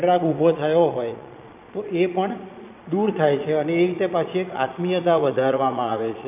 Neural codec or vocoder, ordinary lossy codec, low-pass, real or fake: vocoder, 22.05 kHz, 80 mel bands, Vocos; none; 3.6 kHz; fake